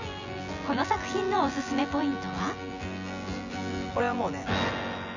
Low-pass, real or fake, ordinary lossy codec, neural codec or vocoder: 7.2 kHz; fake; none; vocoder, 24 kHz, 100 mel bands, Vocos